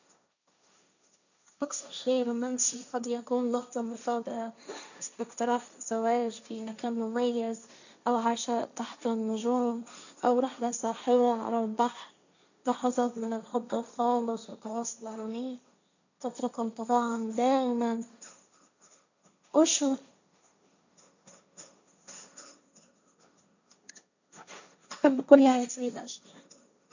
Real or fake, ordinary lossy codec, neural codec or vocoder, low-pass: fake; none; codec, 16 kHz, 1.1 kbps, Voila-Tokenizer; 7.2 kHz